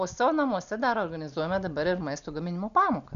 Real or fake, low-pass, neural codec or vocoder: real; 7.2 kHz; none